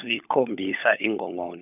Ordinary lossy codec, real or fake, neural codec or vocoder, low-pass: none; fake; codec, 16 kHz, 16 kbps, FunCodec, trained on LibriTTS, 50 frames a second; 3.6 kHz